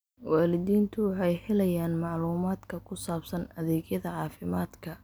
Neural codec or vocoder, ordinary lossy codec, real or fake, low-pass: none; none; real; none